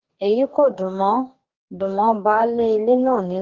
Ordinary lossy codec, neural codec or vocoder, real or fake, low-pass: Opus, 16 kbps; codec, 44.1 kHz, 2.6 kbps, DAC; fake; 7.2 kHz